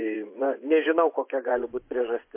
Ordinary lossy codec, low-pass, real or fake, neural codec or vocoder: MP3, 24 kbps; 3.6 kHz; fake; vocoder, 24 kHz, 100 mel bands, Vocos